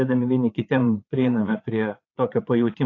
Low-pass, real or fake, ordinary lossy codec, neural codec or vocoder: 7.2 kHz; fake; AAC, 32 kbps; vocoder, 44.1 kHz, 80 mel bands, Vocos